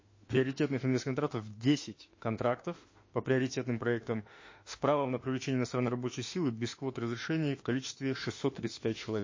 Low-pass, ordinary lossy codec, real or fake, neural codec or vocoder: 7.2 kHz; MP3, 32 kbps; fake; autoencoder, 48 kHz, 32 numbers a frame, DAC-VAE, trained on Japanese speech